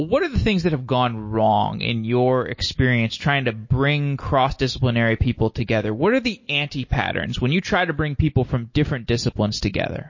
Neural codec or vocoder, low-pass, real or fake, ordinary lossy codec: none; 7.2 kHz; real; MP3, 32 kbps